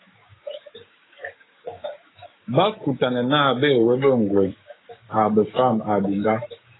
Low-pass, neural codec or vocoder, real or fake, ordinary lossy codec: 7.2 kHz; codec, 16 kHz, 6 kbps, DAC; fake; AAC, 16 kbps